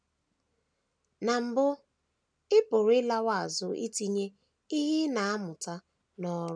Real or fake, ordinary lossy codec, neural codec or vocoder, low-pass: real; none; none; 9.9 kHz